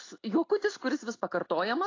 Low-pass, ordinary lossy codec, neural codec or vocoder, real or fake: 7.2 kHz; AAC, 32 kbps; none; real